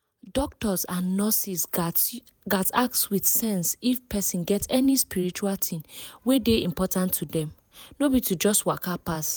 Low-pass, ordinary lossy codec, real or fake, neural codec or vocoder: none; none; fake; vocoder, 48 kHz, 128 mel bands, Vocos